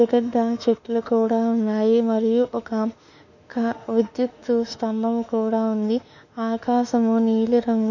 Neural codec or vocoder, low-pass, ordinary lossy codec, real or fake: autoencoder, 48 kHz, 32 numbers a frame, DAC-VAE, trained on Japanese speech; 7.2 kHz; none; fake